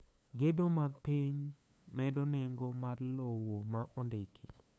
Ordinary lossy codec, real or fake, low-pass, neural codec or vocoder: none; fake; none; codec, 16 kHz, 2 kbps, FunCodec, trained on LibriTTS, 25 frames a second